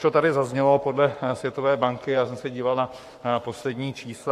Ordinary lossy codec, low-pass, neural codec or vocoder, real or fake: AAC, 64 kbps; 14.4 kHz; codec, 44.1 kHz, 7.8 kbps, Pupu-Codec; fake